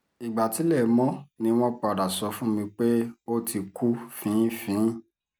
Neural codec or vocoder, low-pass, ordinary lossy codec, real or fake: none; none; none; real